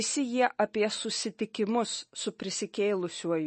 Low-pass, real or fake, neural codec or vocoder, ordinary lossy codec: 10.8 kHz; real; none; MP3, 32 kbps